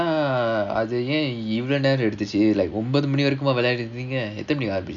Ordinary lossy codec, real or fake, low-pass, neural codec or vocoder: none; real; 7.2 kHz; none